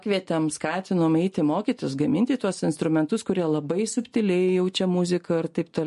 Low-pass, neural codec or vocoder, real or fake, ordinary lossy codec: 14.4 kHz; none; real; MP3, 48 kbps